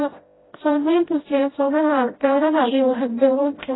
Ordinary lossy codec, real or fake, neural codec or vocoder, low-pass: AAC, 16 kbps; fake; codec, 16 kHz, 0.5 kbps, FreqCodec, smaller model; 7.2 kHz